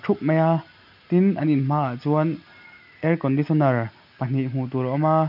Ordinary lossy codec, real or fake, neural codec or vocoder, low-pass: none; real; none; 5.4 kHz